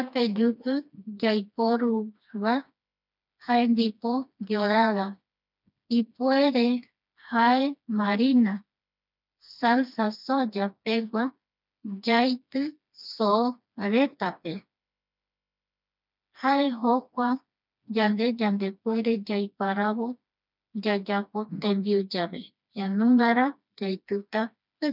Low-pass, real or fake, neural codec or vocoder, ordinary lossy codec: 5.4 kHz; fake; codec, 16 kHz, 2 kbps, FreqCodec, smaller model; none